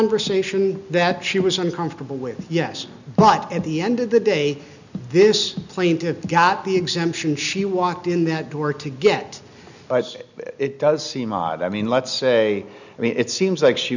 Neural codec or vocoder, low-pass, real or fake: none; 7.2 kHz; real